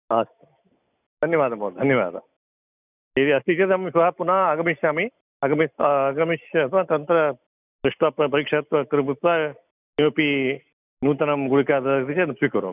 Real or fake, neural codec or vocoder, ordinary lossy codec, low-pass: real; none; none; 3.6 kHz